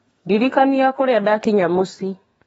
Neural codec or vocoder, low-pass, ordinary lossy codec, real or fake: codec, 32 kHz, 1.9 kbps, SNAC; 14.4 kHz; AAC, 24 kbps; fake